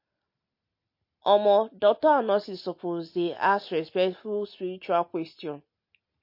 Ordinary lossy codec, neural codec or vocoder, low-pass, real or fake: MP3, 32 kbps; none; 5.4 kHz; real